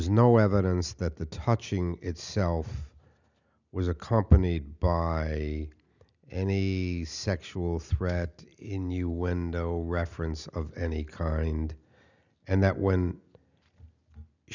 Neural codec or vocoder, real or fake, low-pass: none; real; 7.2 kHz